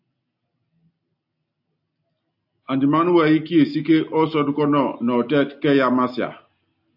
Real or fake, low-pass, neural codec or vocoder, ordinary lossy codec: real; 5.4 kHz; none; AAC, 48 kbps